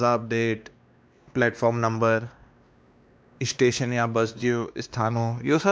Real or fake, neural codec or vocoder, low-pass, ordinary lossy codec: fake; codec, 16 kHz, 2 kbps, X-Codec, WavLM features, trained on Multilingual LibriSpeech; none; none